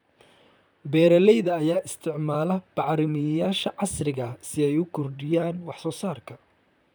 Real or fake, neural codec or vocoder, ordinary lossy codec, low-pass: fake; vocoder, 44.1 kHz, 128 mel bands, Pupu-Vocoder; none; none